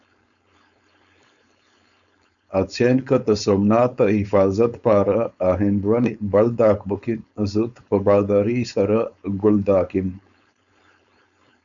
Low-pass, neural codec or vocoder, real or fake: 7.2 kHz; codec, 16 kHz, 4.8 kbps, FACodec; fake